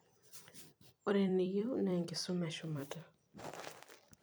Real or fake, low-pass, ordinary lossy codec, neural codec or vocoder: fake; none; none; vocoder, 44.1 kHz, 128 mel bands every 256 samples, BigVGAN v2